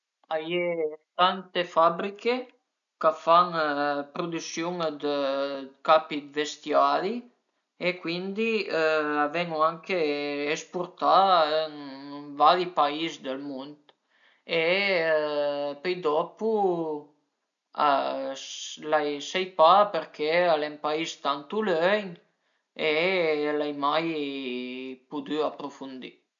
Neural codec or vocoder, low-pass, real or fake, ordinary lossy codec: none; 7.2 kHz; real; none